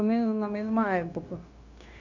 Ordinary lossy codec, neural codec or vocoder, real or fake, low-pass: none; codec, 16 kHz, 0.9 kbps, LongCat-Audio-Codec; fake; 7.2 kHz